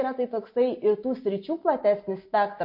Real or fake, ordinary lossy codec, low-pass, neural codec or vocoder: real; MP3, 32 kbps; 5.4 kHz; none